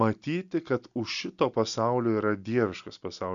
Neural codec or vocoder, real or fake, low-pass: none; real; 7.2 kHz